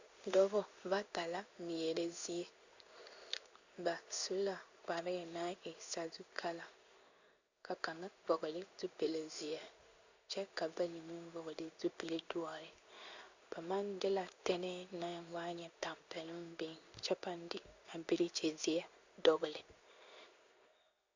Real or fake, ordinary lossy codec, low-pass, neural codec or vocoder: fake; Opus, 64 kbps; 7.2 kHz; codec, 16 kHz in and 24 kHz out, 1 kbps, XY-Tokenizer